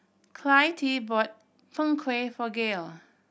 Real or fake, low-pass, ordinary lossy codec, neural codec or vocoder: real; none; none; none